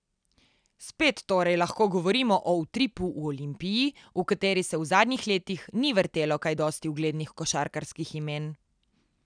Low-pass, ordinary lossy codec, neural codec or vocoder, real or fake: 9.9 kHz; none; none; real